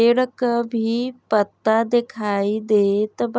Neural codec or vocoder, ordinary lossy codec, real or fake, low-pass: none; none; real; none